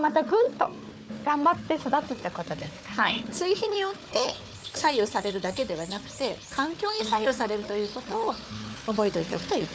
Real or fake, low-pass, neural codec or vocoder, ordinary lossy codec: fake; none; codec, 16 kHz, 4 kbps, FunCodec, trained on Chinese and English, 50 frames a second; none